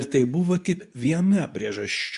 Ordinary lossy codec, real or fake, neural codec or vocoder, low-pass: AAC, 96 kbps; fake; codec, 24 kHz, 0.9 kbps, WavTokenizer, medium speech release version 2; 10.8 kHz